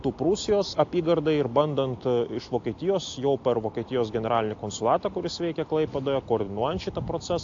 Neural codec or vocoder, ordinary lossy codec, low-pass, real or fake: none; AAC, 48 kbps; 7.2 kHz; real